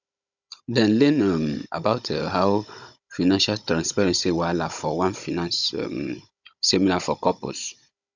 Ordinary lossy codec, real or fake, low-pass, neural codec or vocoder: none; fake; 7.2 kHz; codec, 16 kHz, 16 kbps, FunCodec, trained on Chinese and English, 50 frames a second